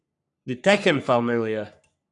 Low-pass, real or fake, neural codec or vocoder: 10.8 kHz; fake; codec, 44.1 kHz, 3.4 kbps, Pupu-Codec